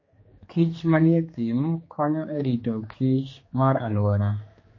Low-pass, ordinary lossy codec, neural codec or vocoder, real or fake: 7.2 kHz; MP3, 32 kbps; codec, 16 kHz, 2 kbps, X-Codec, HuBERT features, trained on general audio; fake